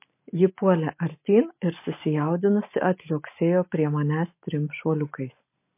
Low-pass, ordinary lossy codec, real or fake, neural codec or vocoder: 3.6 kHz; MP3, 24 kbps; real; none